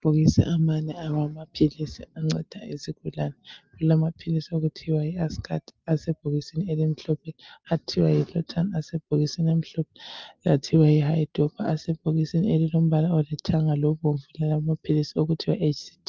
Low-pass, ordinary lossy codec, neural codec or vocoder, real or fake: 7.2 kHz; Opus, 24 kbps; none; real